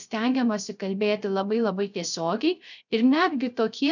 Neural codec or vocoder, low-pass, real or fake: codec, 16 kHz, 0.3 kbps, FocalCodec; 7.2 kHz; fake